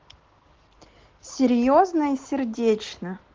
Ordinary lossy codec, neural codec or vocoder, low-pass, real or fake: Opus, 16 kbps; none; 7.2 kHz; real